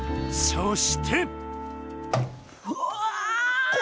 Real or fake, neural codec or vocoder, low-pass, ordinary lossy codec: real; none; none; none